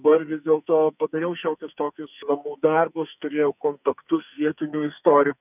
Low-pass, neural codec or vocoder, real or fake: 3.6 kHz; codec, 44.1 kHz, 2.6 kbps, SNAC; fake